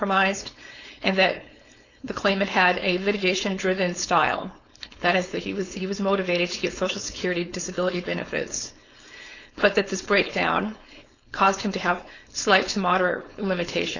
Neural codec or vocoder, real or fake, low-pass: codec, 16 kHz, 4.8 kbps, FACodec; fake; 7.2 kHz